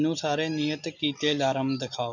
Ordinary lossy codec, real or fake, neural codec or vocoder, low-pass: none; real; none; 7.2 kHz